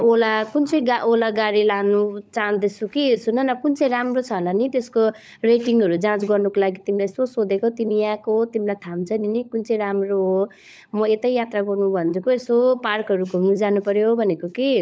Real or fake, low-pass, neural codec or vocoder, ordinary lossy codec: fake; none; codec, 16 kHz, 16 kbps, FunCodec, trained on LibriTTS, 50 frames a second; none